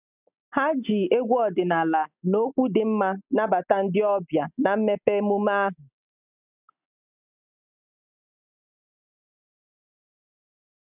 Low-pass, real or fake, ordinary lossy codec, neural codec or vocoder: 3.6 kHz; real; none; none